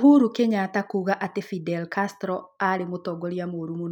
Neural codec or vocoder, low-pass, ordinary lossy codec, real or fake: none; 19.8 kHz; none; real